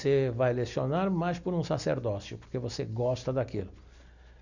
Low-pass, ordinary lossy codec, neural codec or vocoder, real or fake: 7.2 kHz; none; none; real